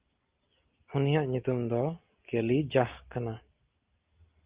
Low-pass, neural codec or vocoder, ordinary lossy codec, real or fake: 3.6 kHz; none; Opus, 32 kbps; real